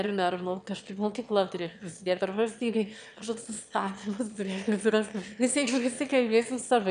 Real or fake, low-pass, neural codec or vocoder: fake; 9.9 kHz; autoencoder, 22.05 kHz, a latent of 192 numbers a frame, VITS, trained on one speaker